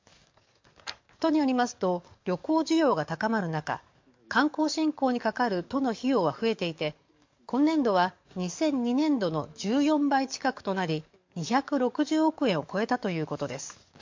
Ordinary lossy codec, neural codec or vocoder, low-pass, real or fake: MP3, 48 kbps; codec, 44.1 kHz, 7.8 kbps, DAC; 7.2 kHz; fake